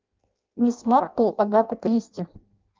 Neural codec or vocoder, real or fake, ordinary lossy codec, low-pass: codec, 16 kHz in and 24 kHz out, 0.6 kbps, FireRedTTS-2 codec; fake; Opus, 24 kbps; 7.2 kHz